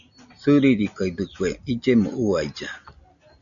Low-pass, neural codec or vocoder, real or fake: 7.2 kHz; none; real